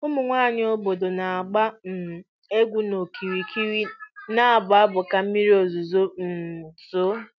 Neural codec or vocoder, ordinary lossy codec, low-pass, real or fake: none; none; 7.2 kHz; real